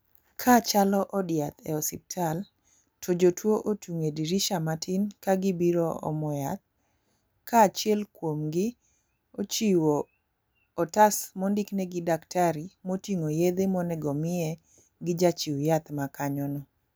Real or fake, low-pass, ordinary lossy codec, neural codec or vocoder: real; none; none; none